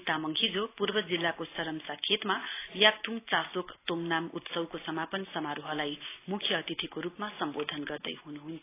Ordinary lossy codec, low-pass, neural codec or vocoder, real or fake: AAC, 24 kbps; 3.6 kHz; none; real